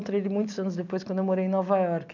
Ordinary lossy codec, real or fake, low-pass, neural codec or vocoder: none; real; 7.2 kHz; none